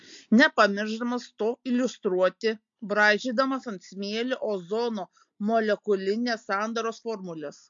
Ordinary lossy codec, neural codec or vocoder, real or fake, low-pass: MP3, 48 kbps; none; real; 7.2 kHz